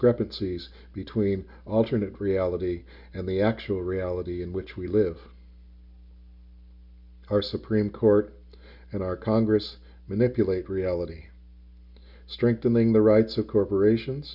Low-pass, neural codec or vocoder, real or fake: 5.4 kHz; none; real